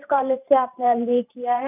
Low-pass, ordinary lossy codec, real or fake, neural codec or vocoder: 3.6 kHz; none; fake; vocoder, 44.1 kHz, 128 mel bands every 512 samples, BigVGAN v2